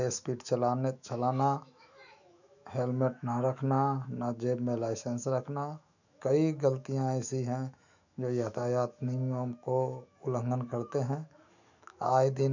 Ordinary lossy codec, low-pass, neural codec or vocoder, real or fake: none; 7.2 kHz; autoencoder, 48 kHz, 128 numbers a frame, DAC-VAE, trained on Japanese speech; fake